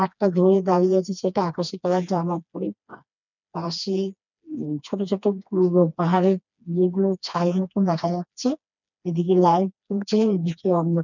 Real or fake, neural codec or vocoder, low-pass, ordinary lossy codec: fake; codec, 16 kHz, 2 kbps, FreqCodec, smaller model; 7.2 kHz; none